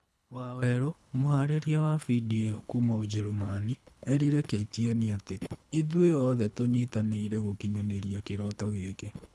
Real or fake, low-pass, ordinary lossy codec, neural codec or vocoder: fake; none; none; codec, 24 kHz, 3 kbps, HILCodec